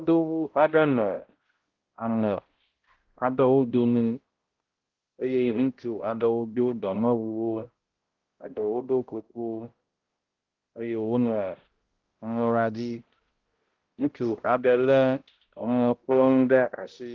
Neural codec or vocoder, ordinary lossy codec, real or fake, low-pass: codec, 16 kHz, 0.5 kbps, X-Codec, HuBERT features, trained on balanced general audio; Opus, 16 kbps; fake; 7.2 kHz